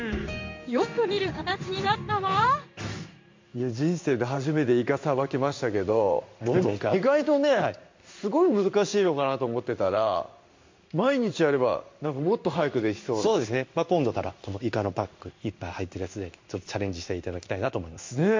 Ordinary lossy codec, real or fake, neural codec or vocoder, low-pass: MP3, 48 kbps; fake; codec, 16 kHz in and 24 kHz out, 1 kbps, XY-Tokenizer; 7.2 kHz